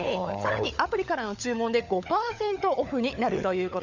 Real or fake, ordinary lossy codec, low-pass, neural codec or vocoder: fake; none; 7.2 kHz; codec, 16 kHz, 16 kbps, FunCodec, trained on LibriTTS, 50 frames a second